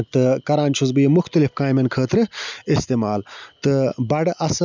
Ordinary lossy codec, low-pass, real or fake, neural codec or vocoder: none; 7.2 kHz; real; none